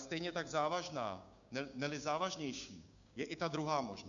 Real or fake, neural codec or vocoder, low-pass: fake; codec, 16 kHz, 6 kbps, DAC; 7.2 kHz